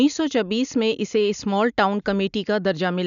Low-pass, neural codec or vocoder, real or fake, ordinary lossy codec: 7.2 kHz; none; real; none